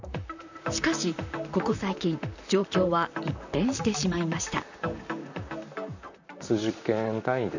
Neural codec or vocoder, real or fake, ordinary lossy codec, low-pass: vocoder, 44.1 kHz, 128 mel bands, Pupu-Vocoder; fake; none; 7.2 kHz